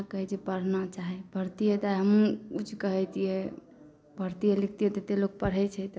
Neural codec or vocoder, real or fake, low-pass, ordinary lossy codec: none; real; none; none